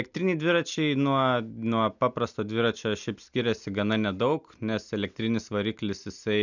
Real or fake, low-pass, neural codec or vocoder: real; 7.2 kHz; none